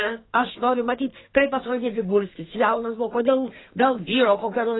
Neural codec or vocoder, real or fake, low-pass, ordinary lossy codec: codec, 32 kHz, 1.9 kbps, SNAC; fake; 7.2 kHz; AAC, 16 kbps